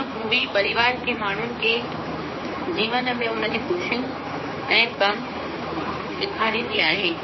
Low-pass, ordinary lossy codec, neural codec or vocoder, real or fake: 7.2 kHz; MP3, 24 kbps; codec, 16 kHz, 4 kbps, X-Codec, HuBERT features, trained on general audio; fake